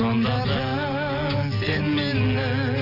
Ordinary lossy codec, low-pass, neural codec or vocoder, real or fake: none; 5.4 kHz; none; real